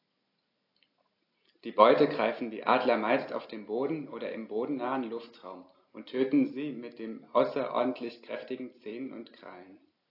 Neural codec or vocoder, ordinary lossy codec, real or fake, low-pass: none; AAC, 32 kbps; real; 5.4 kHz